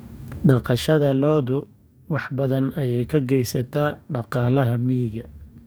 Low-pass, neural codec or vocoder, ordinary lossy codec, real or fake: none; codec, 44.1 kHz, 2.6 kbps, DAC; none; fake